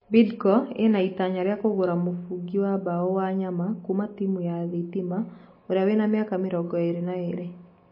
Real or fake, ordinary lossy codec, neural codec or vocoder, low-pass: real; MP3, 24 kbps; none; 5.4 kHz